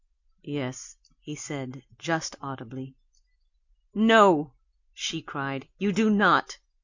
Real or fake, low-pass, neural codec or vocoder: real; 7.2 kHz; none